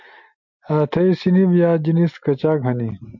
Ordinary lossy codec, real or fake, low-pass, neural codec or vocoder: MP3, 48 kbps; real; 7.2 kHz; none